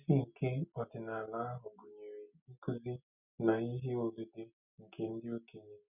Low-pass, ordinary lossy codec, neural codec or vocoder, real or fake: 3.6 kHz; none; none; real